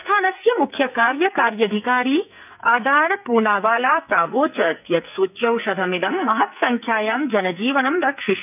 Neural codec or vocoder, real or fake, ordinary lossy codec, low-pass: codec, 44.1 kHz, 2.6 kbps, SNAC; fake; none; 3.6 kHz